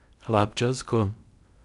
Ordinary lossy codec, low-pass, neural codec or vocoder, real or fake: none; 10.8 kHz; codec, 16 kHz in and 24 kHz out, 0.8 kbps, FocalCodec, streaming, 65536 codes; fake